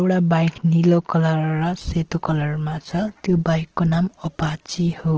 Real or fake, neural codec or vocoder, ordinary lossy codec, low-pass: real; none; Opus, 16 kbps; 7.2 kHz